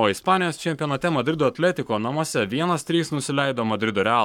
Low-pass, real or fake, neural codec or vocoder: 19.8 kHz; fake; codec, 44.1 kHz, 7.8 kbps, Pupu-Codec